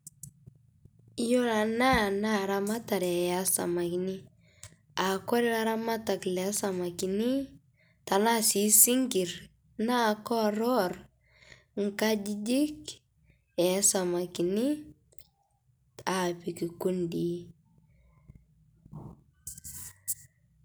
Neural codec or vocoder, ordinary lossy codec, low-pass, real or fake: none; none; none; real